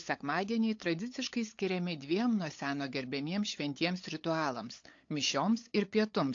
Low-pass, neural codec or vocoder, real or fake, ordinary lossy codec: 7.2 kHz; codec, 16 kHz, 8 kbps, FunCodec, trained on LibriTTS, 25 frames a second; fake; AAC, 48 kbps